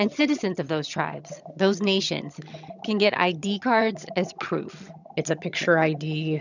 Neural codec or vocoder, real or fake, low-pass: vocoder, 22.05 kHz, 80 mel bands, HiFi-GAN; fake; 7.2 kHz